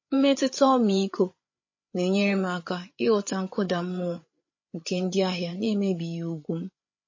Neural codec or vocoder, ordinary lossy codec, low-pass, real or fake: codec, 16 kHz, 8 kbps, FreqCodec, larger model; MP3, 32 kbps; 7.2 kHz; fake